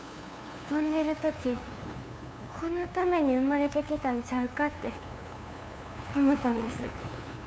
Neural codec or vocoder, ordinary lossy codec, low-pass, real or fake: codec, 16 kHz, 2 kbps, FunCodec, trained on LibriTTS, 25 frames a second; none; none; fake